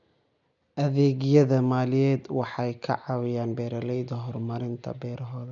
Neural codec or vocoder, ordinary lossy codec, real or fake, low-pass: none; none; real; 7.2 kHz